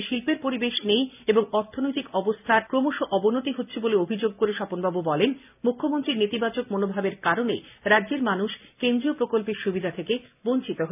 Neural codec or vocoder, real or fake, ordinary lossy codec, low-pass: none; real; none; 3.6 kHz